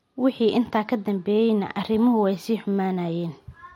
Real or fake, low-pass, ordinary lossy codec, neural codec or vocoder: real; 19.8 kHz; MP3, 64 kbps; none